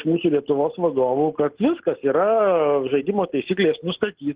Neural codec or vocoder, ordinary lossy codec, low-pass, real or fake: none; Opus, 16 kbps; 3.6 kHz; real